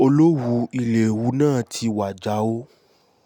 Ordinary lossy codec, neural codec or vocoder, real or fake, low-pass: none; none; real; 19.8 kHz